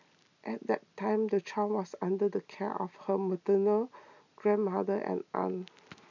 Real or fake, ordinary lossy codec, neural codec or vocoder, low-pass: real; none; none; 7.2 kHz